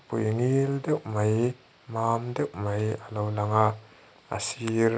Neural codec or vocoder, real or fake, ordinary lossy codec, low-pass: none; real; none; none